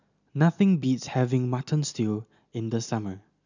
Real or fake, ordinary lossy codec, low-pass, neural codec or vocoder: real; none; 7.2 kHz; none